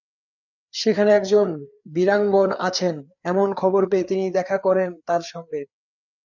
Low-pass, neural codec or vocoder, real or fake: 7.2 kHz; codec, 16 kHz, 4 kbps, FreqCodec, larger model; fake